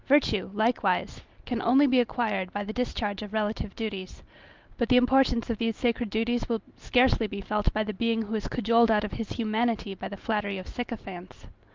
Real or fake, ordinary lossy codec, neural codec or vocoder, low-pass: real; Opus, 32 kbps; none; 7.2 kHz